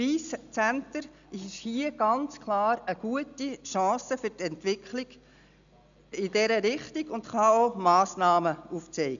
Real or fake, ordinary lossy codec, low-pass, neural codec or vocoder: real; none; 7.2 kHz; none